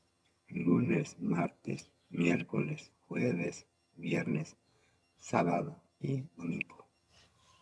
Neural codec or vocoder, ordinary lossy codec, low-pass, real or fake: vocoder, 22.05 kHz, 80 mel bands, HiFi-GAN; none; none; fake